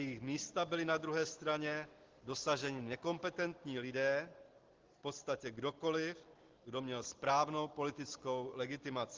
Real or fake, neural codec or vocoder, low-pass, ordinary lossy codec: real; none; 7.2 kHz; Opus, 16 kbps